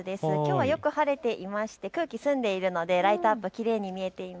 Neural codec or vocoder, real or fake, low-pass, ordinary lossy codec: none; real; none; none